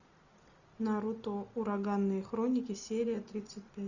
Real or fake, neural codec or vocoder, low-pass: real; none; 7.2 kHz